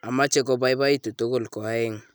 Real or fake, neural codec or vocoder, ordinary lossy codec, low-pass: real; none; none; none